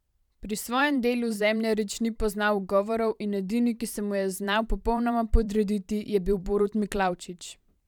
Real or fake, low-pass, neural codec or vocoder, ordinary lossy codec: fake; 19.8 kHz; vocoder, 44.1 kHz, 128 mel bands every 512 samples, BigVGAN v2; none